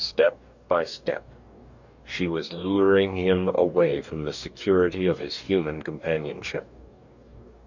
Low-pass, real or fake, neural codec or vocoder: 7.2 kHz; fake; codec, 44.1 kHz, 2.6 kbps, DAC